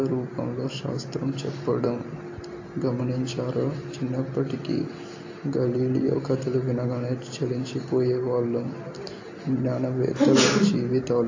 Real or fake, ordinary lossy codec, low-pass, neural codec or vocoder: real; AAC, 48 kbps; 7.2 kHz; none